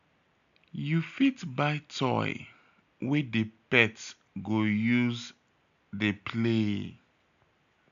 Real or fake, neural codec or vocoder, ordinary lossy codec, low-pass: real; none; AAC, 96 kbps; 7.2 kHz